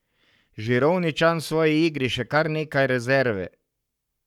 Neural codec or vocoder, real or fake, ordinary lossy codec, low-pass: codec, 44.1 kHz, 7.8 kbps, Pupu-Codec; fake; none; 19.8 kHz